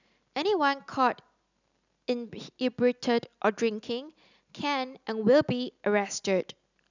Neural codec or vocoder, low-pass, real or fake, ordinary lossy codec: none; 7.2 kHz; real; none